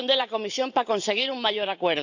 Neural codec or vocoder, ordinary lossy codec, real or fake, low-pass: vocoder, 44.1 kHz, 128 mel bands every 512 samples, BigVGAN v2; none; fake; 7.2 kHz